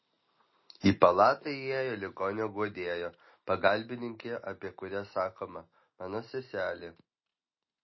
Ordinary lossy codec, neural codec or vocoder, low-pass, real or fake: MP3, 24 kbps; none; 7.2 kHz; real